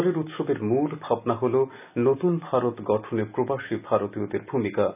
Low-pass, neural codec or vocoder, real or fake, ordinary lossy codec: 3.6 kHz; none; real; none